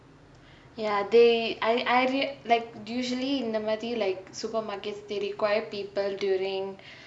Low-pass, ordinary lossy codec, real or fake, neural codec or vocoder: 9.9 kHz; none; real; none